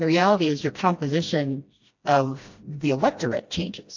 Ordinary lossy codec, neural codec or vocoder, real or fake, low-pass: AAC, 48 kbps; codec, 16 kHz, 1 kbps, FreqCodec, smaller model; fake; 7.2 kHz